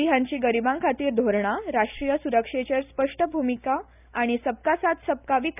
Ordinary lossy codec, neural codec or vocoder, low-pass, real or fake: none; none; 3.6 kHz; real